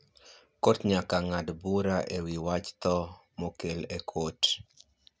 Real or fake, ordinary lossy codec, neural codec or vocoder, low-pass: real; none; none; none